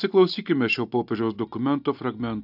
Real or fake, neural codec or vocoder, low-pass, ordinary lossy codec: real; none; 5.4 kHz; AAC, 48 kbps